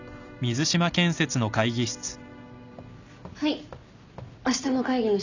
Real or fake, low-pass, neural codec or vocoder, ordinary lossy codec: real; 7.2 kHz; none; none